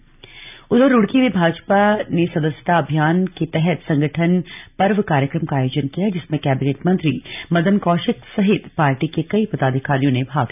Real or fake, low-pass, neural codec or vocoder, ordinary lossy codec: real; 3.6 kHz; none; none